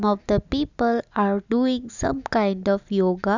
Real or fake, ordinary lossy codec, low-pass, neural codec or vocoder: real; none; 7.2 kHz; none